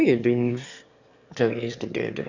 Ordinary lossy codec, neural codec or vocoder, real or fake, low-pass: Opus, 64 kbps; autoencoder, 22.05 kHz, a latent of 192 numbers a frame, VITS, trained on one speaker; fake; 7.2 kHz